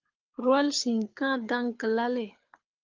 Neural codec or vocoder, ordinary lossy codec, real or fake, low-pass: codec, 24 kHz, 6 kbps, HILCodec; Opus, 24 kbps; fake; 7.2 kHz